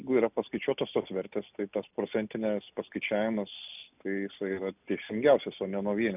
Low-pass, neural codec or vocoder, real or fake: 3.6 kHz; none; real